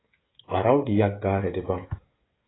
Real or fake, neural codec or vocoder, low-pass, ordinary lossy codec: fake; codec, 16 kHz, 16 kbps, FreqCodec, smaller model; 7.2 kHz; AAC, 16 kbps